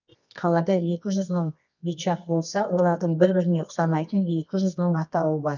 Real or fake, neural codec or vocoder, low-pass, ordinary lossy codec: fake; codec, 24 kHz, 0.9 kbps, WavTokenizer, medium music audio release; 7.2 kHz; none